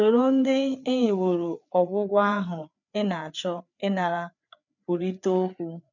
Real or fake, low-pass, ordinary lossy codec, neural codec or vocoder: fake; 7.2 kHz; none; codec, 16 kHz, 4 kbps, FreqCodec, larger model